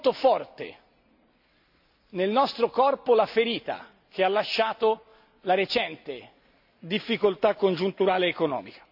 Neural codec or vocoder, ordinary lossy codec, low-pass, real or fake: none; AAC, 48 kbps; 5.4 kHz; real